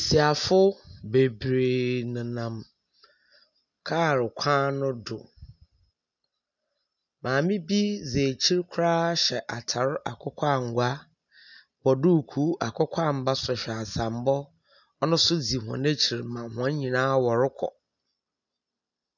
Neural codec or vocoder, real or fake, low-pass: none; real; 7.2 kHz